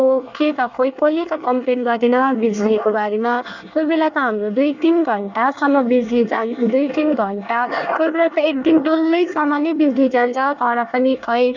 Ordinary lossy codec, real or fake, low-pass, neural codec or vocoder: none; fake; 7.2 kHz; codec, 24 kHz, 1 kbps, SNAC